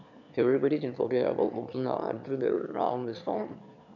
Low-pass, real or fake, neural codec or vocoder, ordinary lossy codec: 7.2 kHz; fake; autoencoder, 22.05 kHz, a latent of 192 numbers a frame, VITS, trained on one speaker; none